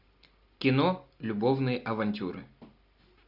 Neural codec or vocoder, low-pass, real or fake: none; 5.4 kHz; real